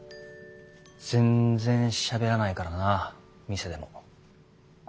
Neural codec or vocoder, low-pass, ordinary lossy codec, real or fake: none; none; none; real